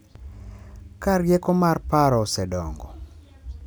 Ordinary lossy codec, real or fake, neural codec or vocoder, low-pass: none; real; none; none